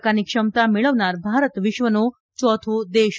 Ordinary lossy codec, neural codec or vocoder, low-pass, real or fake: none; none; none; real